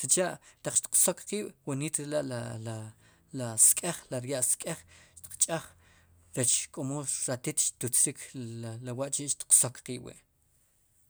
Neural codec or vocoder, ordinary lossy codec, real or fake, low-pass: none; none; real; none